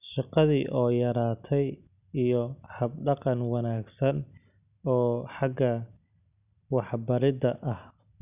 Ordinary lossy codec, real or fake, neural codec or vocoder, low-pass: none; real; none; 3.6 kHz